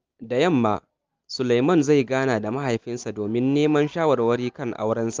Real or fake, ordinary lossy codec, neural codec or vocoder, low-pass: real; Opus, 24 kbps; none; 7.2 kHz